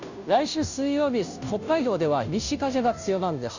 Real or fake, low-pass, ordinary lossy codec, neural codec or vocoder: fake; 7.2 kHz; none; codec, 16 kHz, 0.5 kbps, FunCodec, trained on Chinese and English, 25 frames a second